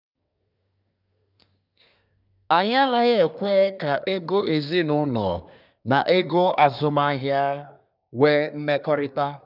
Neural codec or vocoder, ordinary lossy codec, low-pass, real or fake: codec, 24 kHz, 1 kbps, SNAC; none; 5.4 kHz; fake